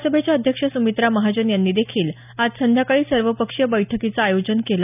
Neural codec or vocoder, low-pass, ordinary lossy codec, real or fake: none; 3.6 kHz; none; real